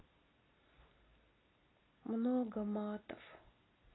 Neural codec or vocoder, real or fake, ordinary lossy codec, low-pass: none; real; AAC, 16 kbps; 7.2 kHz